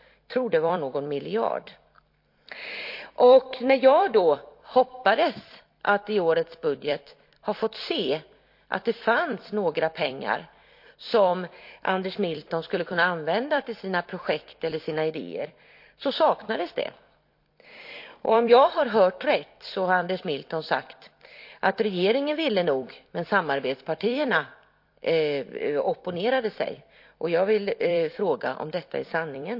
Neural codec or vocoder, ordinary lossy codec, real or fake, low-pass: vocoder, 44.1 kHz, 128 mel bands every 256 samples, BigVGAN v2; MP3, 32 kbps; fake; 5.4 kHz